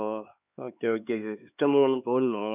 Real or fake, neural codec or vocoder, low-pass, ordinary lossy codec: fake; codec, 16 kHz, 4 kbps, X-Codec, HuBERT features, trained on LibriSpeech; 3.6 kHz; none